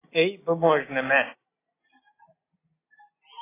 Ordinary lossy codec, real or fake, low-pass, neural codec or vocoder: AAC, 16 kbps; real; 3.6 kHz; none